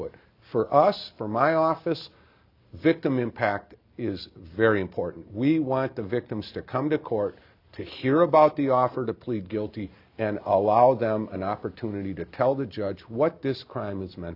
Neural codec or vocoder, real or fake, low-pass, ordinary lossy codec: none; real; 5.4 kHz; AAC, 48 kbps